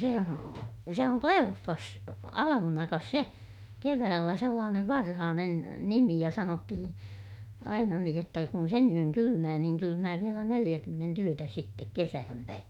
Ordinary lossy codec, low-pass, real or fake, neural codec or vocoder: none; 19.8 kHz; fake; autoencoder, 48 kHz, 32 numbers a frame, DAC-VAE, trained on Japanese speech